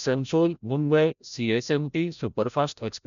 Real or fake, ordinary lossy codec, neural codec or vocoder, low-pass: fake; none; codec, 16 kHz, 0.5 kbps, FreqCodec, larger model; 7.2 kHz